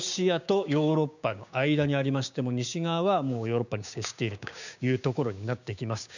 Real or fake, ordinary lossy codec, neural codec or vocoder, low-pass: fake; none; codec, 16 kHz, 6 kbps, DAC; 7.2 kHz